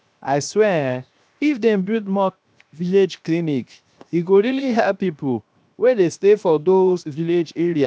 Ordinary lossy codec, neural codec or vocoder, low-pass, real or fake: none; codec, 16 kHz, 0.7 kbps, FocalCodec; none; fake